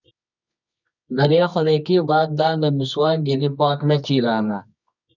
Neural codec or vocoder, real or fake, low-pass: codec, 24 kHz, 0.9 kbps, WavTokenizer, medium music audio release; fake; 7.2 kHz